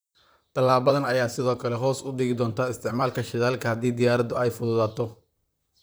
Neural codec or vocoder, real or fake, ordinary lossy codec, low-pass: vocoder, 44.1 kHz, 128 mel bands, Pupu-Vocoder; fake; none; none